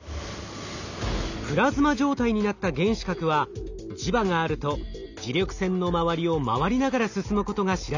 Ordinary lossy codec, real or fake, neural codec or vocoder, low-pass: none; real; none; 7.2 kHz